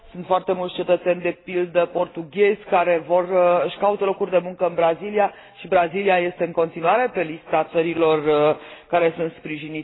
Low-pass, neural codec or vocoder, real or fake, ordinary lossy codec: 7.2 kHz; none; real; AAC, 16 kbps